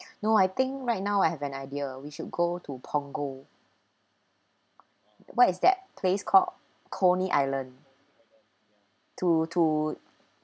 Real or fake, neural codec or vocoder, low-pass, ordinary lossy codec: real; none; none; none